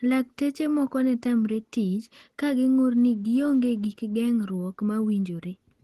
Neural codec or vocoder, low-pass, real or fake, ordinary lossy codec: none; 14.4 kHz; real; Opus, 16 kbps